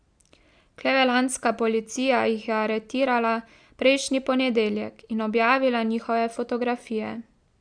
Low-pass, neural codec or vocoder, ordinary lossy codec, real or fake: 9.9 kHz; none; Opus, 64 kbps; real